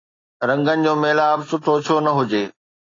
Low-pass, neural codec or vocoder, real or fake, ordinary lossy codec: 7.2 kHz; none; real; AAC, 32 kbps